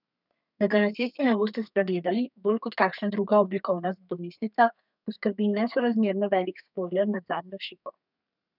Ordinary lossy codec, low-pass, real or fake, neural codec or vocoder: none; 5.4 kHz; fake; codec, 32 kHz, 1.9 kbps, SNAC